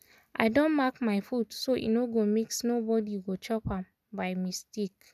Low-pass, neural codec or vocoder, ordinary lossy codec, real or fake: 14.4 kHz; none; none; real